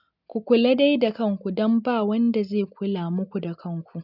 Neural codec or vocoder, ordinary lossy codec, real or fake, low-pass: none; none; real; 5.4 kHz